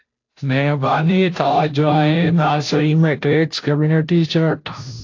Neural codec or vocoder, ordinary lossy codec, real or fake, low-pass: codec, 16 kHz, 0.5 kbps, FunCodec, trained on Chinese and English, 25 frames a second; AAC, 48 kbps; fake; 7.2 kHz